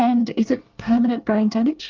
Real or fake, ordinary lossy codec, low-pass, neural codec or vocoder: fake; Opus, 24 kbps; 7.2 kHz; codec, 24 kHz, 1 kbps, SNAC